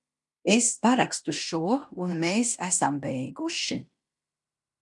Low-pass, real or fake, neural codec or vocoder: 10.8 kHz; fake; codec, 16 kHz in and 24 kHz out, 0.9 kbps, LongCat-Audio-Codec, fine tuned four codebook decoder